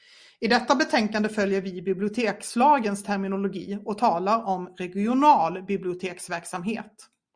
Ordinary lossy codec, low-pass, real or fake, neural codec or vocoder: Opus, 64 kbps; 9.9 kHz; real; none